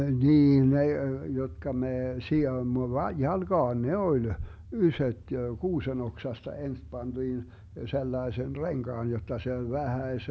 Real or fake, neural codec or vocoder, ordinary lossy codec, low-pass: real; none; none; none